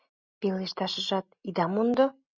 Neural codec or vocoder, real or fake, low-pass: none; real; 7.2 kHz